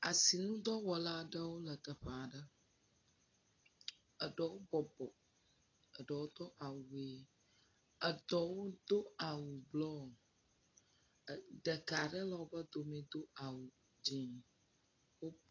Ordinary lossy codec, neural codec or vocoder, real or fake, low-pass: AAC, 32 kbps; none; real; 7.2 kHz